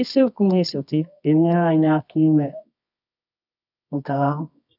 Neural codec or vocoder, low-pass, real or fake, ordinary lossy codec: codec, 24 kHz, 0.9 kbps, WavTokenizer, medium music audio release; 5.4 kHz; fake; none